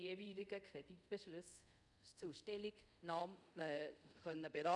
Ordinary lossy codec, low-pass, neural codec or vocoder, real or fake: none; 10.8 kHz; codec, 24 kHz, 0.5 kbps, DualCodec; fake